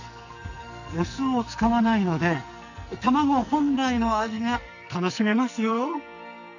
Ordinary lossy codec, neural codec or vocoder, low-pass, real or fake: none; codec, 44.1 kHz, 2.6 kbps, SNAC; 7.2 kHz; fake